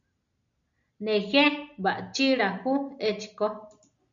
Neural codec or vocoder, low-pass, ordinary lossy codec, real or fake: none; 7.2 kHz; MP3, 96 kbps; real